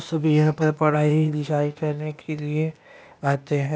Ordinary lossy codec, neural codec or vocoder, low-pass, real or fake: none; codec, 16 kHz, 0.8 kbps, ZipCodec; none; fake